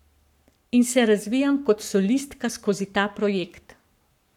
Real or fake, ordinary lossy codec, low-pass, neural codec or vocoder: fake; none; 19.8 kHz; codec, 44.1 kHz, 7.8 kbps, Pupu-Codec